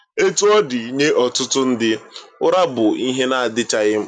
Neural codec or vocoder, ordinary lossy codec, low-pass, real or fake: none; none; 9.9 kHz; real